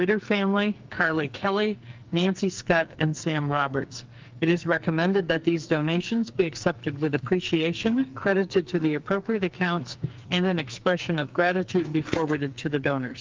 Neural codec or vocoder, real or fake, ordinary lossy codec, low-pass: codec, 44.1 kHz, 2.6 kbps, SNAC; fake; Opus, 24 kbps; 7.2 kHz